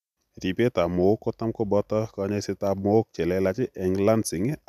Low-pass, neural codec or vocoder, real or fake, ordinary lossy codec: 14.4 kHz; none; real; none